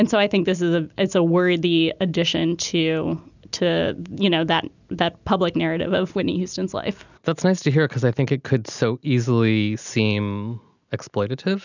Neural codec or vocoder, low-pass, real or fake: none; 7.2 kHz; real